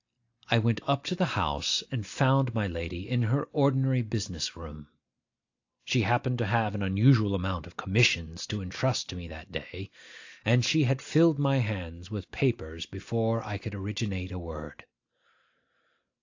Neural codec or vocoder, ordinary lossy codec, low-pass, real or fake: none; AAC, 48 kbps; 7.2 kHz; real